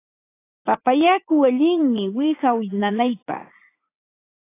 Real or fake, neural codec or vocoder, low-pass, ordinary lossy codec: fake; vocoder, 44.1 kHz, 80 mel bands, Vocos; 3.6 kHz; AAC, 24 kbps